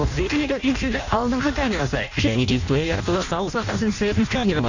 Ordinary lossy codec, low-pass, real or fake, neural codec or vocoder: none; 7.2 kHz; fake; codec, 16 kHz in and 24 kHz out, 0.6 kbps, FireRedTTS-2 codec